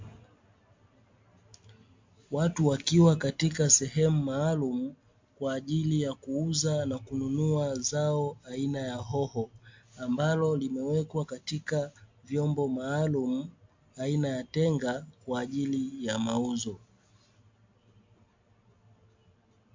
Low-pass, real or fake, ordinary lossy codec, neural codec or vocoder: 7.2 kHz; real; MP3, 48 kbps; none